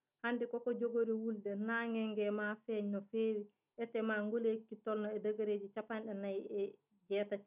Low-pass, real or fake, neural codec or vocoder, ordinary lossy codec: 3.6 kHz; real; none; none